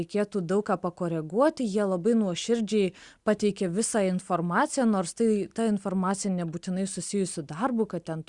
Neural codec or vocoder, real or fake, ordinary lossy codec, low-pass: none; real; Opus, 64 kbps; 10.8 kHz